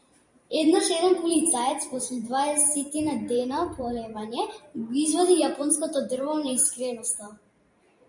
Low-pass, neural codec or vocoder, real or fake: 10.8 kHz; vocoder, 44.1 kHz, 128 mel bands every 512 samples, BigVGAN v2; fake